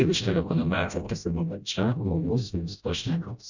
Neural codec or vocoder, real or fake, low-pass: codec, 16 kHz, 0.5 kbps, FreqCodec, smaller model; fake; 7.2 kHz